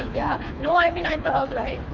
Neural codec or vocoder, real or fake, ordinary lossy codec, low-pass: codec, 24 kHz, 3 kbps, HILCodec; fake; none; 7.2 kHz